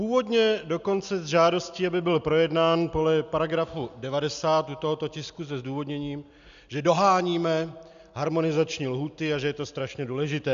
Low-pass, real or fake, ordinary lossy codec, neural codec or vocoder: 7.2 kHz; real; MP3, 96 kbps; none